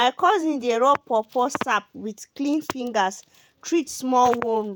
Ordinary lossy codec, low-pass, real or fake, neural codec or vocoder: none; none; fake; vocoder, 48 kHz, 128 mel bands, Vocos